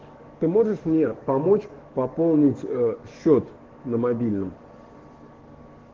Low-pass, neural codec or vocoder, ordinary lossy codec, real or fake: 7.2 kHz; codec, 44.1 kHz, 7.8 kbps, DAC; Opus, 16 kbps; fake